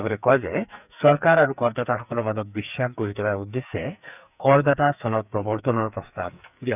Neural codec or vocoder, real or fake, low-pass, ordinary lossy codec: codec, 44.1 kHz, 2.6 kbps, SNAC; fake; 3.6 kHz; none